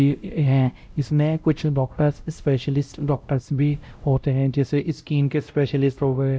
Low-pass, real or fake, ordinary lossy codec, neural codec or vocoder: none; fake; none; codec, 16 kHz, 0.5 kbps, X-Codec, WavLM features, trained on Multilingual LibriSpeech